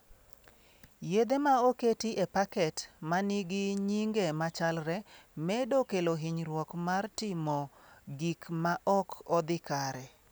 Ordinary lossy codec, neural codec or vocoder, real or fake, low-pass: none; none; real; none